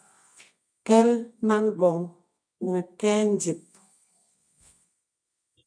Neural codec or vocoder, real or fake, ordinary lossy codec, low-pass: codec, 24 kHz, 0.9 kbps, WavTokenizer, medium music audio release; fake; MP3, 96 kbps; 9.9 kHz